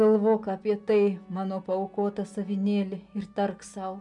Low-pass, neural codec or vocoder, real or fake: 10.8 kHz; none; real